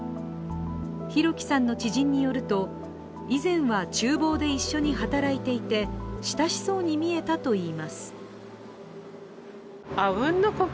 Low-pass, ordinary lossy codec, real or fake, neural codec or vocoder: none; none; real; none